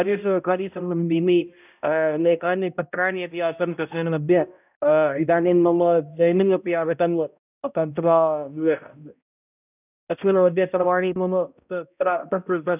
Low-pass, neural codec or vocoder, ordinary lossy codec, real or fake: 3.6 kHz; codec, 16 kHz, 0.5 kbps, X-Codec, HuBERT features, trained on balanced general audio; none; fake